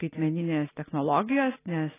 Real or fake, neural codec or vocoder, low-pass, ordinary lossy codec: real; none; 3.6 kHz; AAC, 16 kbps